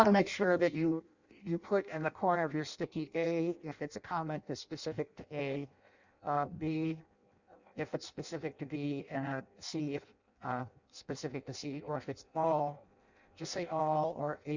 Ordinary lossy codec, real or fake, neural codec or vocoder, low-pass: Opus, 64 kbps; fake; codec, 16 kHz in and 24 kHz out, 0.6 kbps, FireRedTTS-2 codec; 7.2 kHz